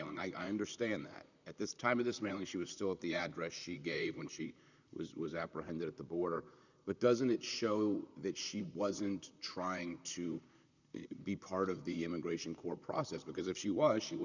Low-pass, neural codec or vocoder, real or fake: 7.2 kHz; vocoder, 44.1 kHz, 128 mel bands, Pupu-Vocoder; fake